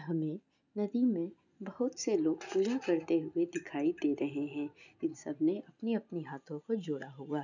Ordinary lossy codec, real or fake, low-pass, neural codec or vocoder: none; real; 7.2 kHz; none